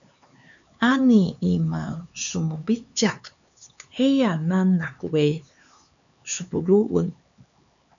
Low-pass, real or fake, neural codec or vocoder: 7.2 kHz; fake; codec, 16 kHz, 4 kbps, X-Codec, HuBERT features, trained on LibriSpeech